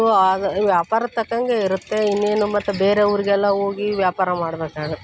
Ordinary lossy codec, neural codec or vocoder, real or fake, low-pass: none; none; real; none